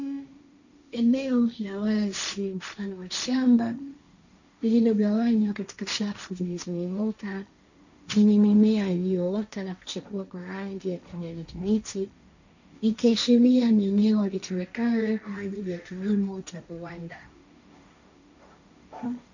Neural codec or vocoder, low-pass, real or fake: codec, 16 kHz, 1.1 kbps, Voila-Tokenizer; 7.2 kHz; fake